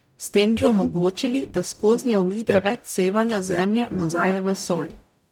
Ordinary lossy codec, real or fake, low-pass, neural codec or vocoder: none; fake; 19.8 kHz; codec, 44.1 kHz, 0.9 kbps, DAC